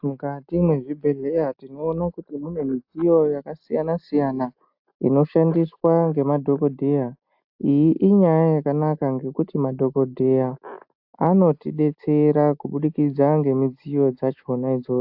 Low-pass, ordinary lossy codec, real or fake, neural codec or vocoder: 5.4 kHz; AAC, 48 kbps; real; none